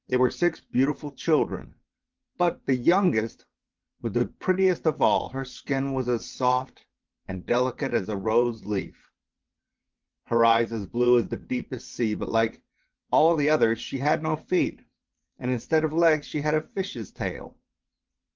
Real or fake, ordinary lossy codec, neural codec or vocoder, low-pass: fake; Opus, 32 kbps; vocoder, 22.05 kHz, 80 mel bands, WaveNeXt; 7.2 kHz